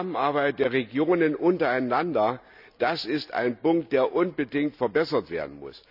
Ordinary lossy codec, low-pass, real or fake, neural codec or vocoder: none; 5.4 kHz; real; none